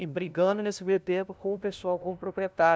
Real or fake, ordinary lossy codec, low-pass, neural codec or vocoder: fake; none; none; codec, 16 kHz, 0.5 kbps, FunCodec, trained on LibriTTS, 25 frames a second